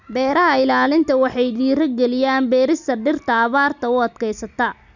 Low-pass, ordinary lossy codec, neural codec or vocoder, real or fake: 7.2 kHz; none; none; real